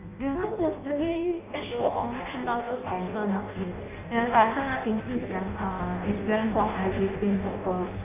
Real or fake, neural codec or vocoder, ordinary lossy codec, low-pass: fake; codec, 16 kHz in and 24 kHz out, 0.6 kbps, FireRedTTS-2 codec; none; 3.6 kHz